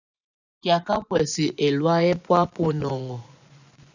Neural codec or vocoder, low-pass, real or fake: vocoder, 24 kHz, 100 mel bands, Vocos; 7.2 kHz; fake